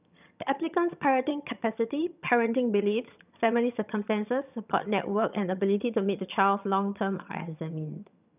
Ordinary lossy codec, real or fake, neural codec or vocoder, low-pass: none; fake; vocoder, 22.05 kHz, 80 mel bands, HiFi-GAN; 3.6 kHz